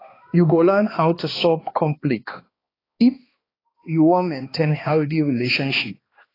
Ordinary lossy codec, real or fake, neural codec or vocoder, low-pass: AAC, 24 kbps; fake; codec, 16 kHz, 2 kbps, X-Codec, HuBERT features, trained on balanced general audio; 5.4 kHz